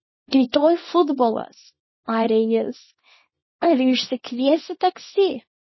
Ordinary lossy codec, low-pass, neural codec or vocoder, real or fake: MP3, 24 kbps; 7.2 kHz; codec, 24 kHz, 0.9 kbps, WavTokenizer, small release; fake